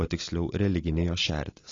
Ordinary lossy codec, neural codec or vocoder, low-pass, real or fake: AAC, 32 kbps; none; 7.2 kHz; real